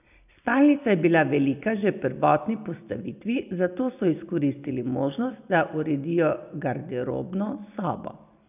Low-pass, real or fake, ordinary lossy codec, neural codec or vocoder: 3.6 kHz; real; none; none